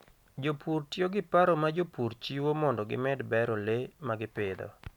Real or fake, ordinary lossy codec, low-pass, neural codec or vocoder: real; none; 19.8 kHz; none